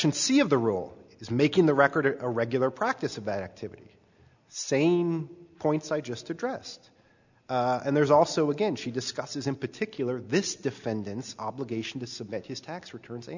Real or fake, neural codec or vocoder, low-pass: real; none; 7.2 kHz